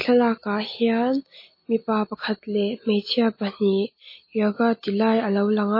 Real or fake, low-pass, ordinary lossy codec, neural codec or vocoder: real; 5.4 kHz; MP3, 24 kbps; none